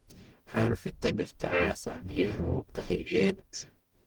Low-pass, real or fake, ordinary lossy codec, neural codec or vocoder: 19.8 kHz; fake; Opus, 24 kbps; codec, 44.1 kHz, 0.9 kbps, DAC